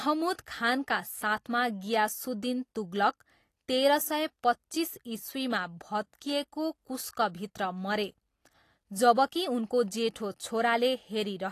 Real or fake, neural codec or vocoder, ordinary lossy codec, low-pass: real; none; AAC, 48 kbps; 14.4 kHz